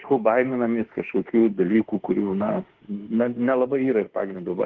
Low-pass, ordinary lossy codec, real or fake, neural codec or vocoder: 7.2 kHz; Opus, 16 kbps; fake; autoencoder, 48 kHz, 32 numbers a frame, DAC-VAE, trained on Japanese speech